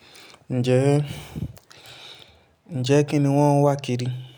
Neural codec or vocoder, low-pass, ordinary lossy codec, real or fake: none; none; none; real